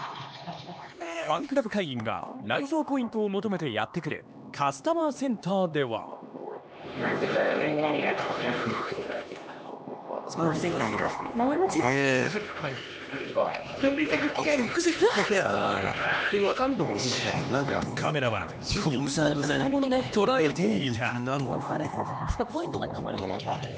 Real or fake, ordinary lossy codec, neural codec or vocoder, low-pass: fake; none; codec, 16 kHz, 2 kbps, X-Codec, HuBERT features, trained on LibriSpeech; none